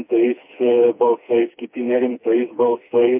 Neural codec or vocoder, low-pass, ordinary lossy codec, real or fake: codec, 16 kHz, 2 kbps, FreqCodec, smaller model; 3.6 kHz; MP3, 32 kbps; fake